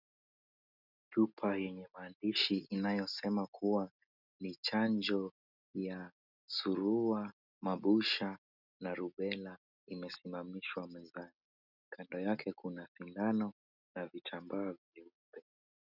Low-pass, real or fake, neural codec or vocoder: 5.4 kHz; real; none